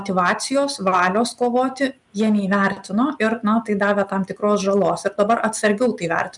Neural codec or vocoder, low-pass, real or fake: none; 10.8 kHz; real